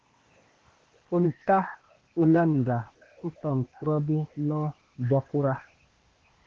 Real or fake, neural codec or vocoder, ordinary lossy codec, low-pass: fake; codec, 16 kHz, 0.8 kbps, ZipCodec; Opus, 16 kbps; 7.2 kHz